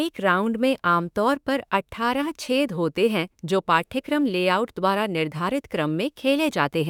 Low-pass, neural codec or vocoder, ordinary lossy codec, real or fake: 19.8 kHz; autoencoder, 48 kHz, 32 numbers a frame, DAC-VAE, trained on Japanese speech; none; fake